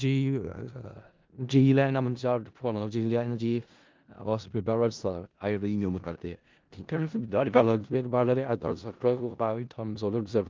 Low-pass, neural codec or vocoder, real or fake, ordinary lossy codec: 7.2 kHz; codec, 16 kHz in and 24 kHz out, 0.4 kbps, LongCat-Audio-Codec, four codebook decoder; fake; Opus, 32 kbps